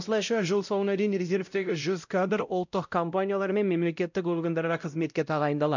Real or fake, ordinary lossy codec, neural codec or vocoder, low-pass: fake; none; codec, 16 kHz, 0.5 kbps, X-Codec, WavLM features, trained on Multilingual LibriSpeech; 7.2 kHz